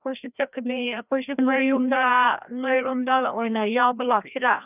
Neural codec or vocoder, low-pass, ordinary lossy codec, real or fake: codec, 16 kHz, 1 kbps, FreqCodec, larger model; 3.6 kHz; none; fake